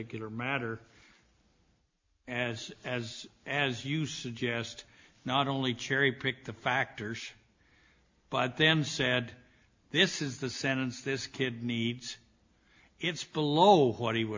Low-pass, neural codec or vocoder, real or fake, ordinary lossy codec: 7.2 kHz; none; real; MP3, 48 kbps